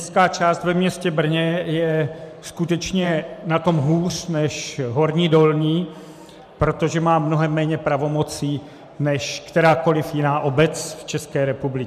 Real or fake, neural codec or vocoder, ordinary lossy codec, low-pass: fake; vocoder, 44.1 kHz, 128 mel bands every 512 samples, BigVGAN v2; MP3, 96 kbps; 14.4 kHz